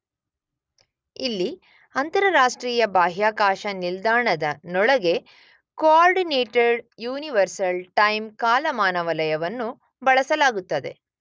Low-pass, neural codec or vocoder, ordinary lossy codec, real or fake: none; none; none; real